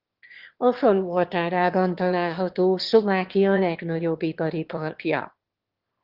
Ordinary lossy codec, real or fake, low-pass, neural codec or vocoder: Opus, 16 kbps; fake; 5.4 kHz; autoencoder, 22.05 kHz, a latent of 192 numbers a frame, VITS, trained on one speaker